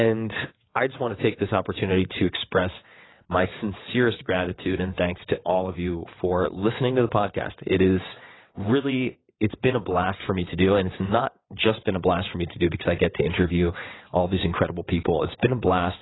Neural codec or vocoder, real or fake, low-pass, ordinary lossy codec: vocoder, 22.05 kHz, 80 mel bands, WaveNeXt; fake; 7.2 kHz; AAC, 16 kbps